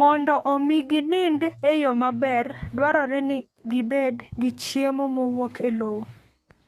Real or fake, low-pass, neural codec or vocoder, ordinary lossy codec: fake; 14.4 kHz; codec, 32 kHz, 1.9 kbps, SNAC; Opus, 64 kbps